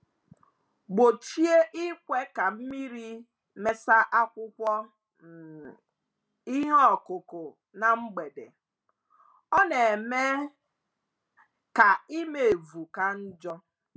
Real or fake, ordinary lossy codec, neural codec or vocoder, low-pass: real; none; none; none